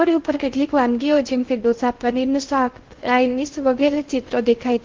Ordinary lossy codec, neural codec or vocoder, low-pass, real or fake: Opus, 16 kbps; codec, 16 kHz in and 24 kHz out, 0.6 kbps, FocalCodec, streaming, 2048 codes; 7.2 kHz; fake